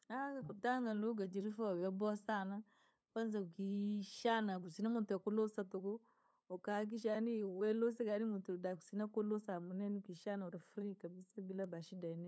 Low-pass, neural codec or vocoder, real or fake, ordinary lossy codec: none; codec, 16 kHz, 8 kbps, FunCodec, trained on LibriTTS, 25 frames a second; fake; none